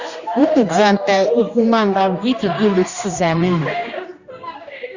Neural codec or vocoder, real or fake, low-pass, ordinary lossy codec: codec, 16 kHz, 1 kbps, X-Codec, HuBERT features, trained on general audio; fake; 7.2 kHz; Opus, 64 kbps